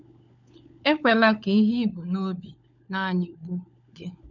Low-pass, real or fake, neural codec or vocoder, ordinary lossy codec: 7.2 kHz; fake; codec, 16 kHz, 16 kbps, FunCodec, trained on LibriTTS, 50 frames a second; none